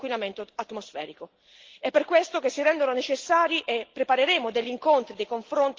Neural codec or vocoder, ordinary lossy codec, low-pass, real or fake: none; Opus, 16 kbps; 7.2 kHz; real